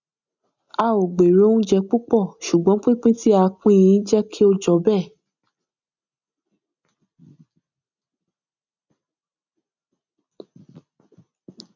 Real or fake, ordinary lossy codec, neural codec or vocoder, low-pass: real; none; none; 7.2 kHz